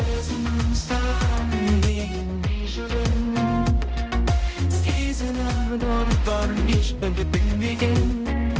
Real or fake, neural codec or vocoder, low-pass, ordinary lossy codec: fake; codec, 16 kHz, 0.5 kbps, X-Codec, HuBERT features, trained on balanced general audio; none; none